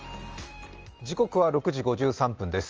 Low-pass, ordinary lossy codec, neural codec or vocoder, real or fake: 7.2 kHz; Opus, 24 kbps; none; real